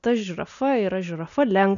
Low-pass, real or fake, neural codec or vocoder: 7.2 kHz; real; none